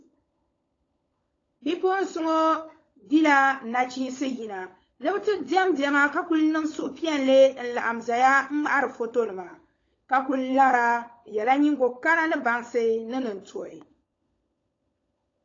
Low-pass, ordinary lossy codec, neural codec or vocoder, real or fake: 7.2 kHz; AAC, 32 kbps; codec, 16 kHz, 16 kbps, FunCodec, trained on LibriTTS, 50 frames a second; fake